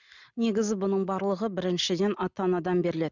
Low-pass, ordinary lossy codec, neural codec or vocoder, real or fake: 7.2 kHz; none; none; real